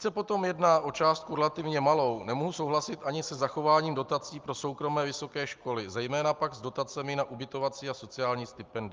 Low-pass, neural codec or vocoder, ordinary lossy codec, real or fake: 7.2 kHz; none; Opus, 24 kbps; real